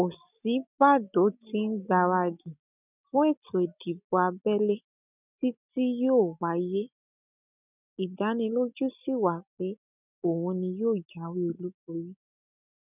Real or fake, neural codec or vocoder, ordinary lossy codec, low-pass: real; none; none; 3.6 kHz